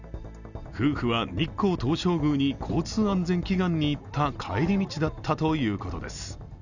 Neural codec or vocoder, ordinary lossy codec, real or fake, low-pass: none; none; real; 7.2 kHz